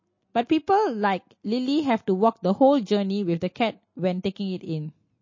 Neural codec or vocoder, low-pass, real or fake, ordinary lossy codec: none; 7.2 kHz; real; MP3, 32 kbps